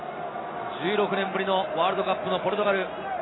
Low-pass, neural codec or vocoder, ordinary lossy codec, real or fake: 7.2 kHz; none; AAC, 16 kbps; real